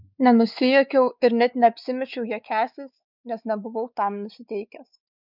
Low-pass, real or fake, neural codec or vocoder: 5.4 kHz; fake; codec, 16 kHz, 4 kbps, X-Codec, WavLM features, trained on Multilingual LibriSpeech